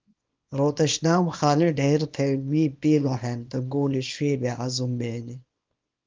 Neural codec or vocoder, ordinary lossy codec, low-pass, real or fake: codec, 24 kHz, 0.9 kbps, WavTokenizer, small release; Opus, 32 kbps; 7.2 kHz; fake